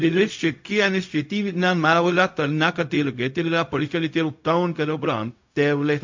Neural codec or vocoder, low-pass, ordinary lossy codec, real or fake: codec, 16 kHz, 0.4 kbps, LongCat-Audio-Codec; 7.2 kHz; MP3, 48 kbps; fake